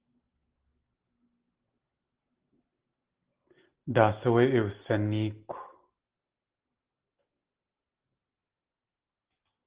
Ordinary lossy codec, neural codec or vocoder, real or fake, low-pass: Opus, 16 kbps; none; real; 3.6 kHz